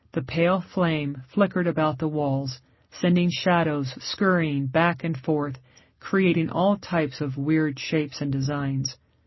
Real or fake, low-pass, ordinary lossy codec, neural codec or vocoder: real; 7.2 kHz; MP3, 24 kbps; none